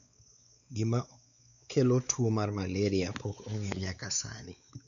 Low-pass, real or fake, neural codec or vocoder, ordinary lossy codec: 7.2 kHz; fake; codec, 16 kHz, 4 kbps, X-Codec, WavLM features, trained on Multilingual LibriSpeech; none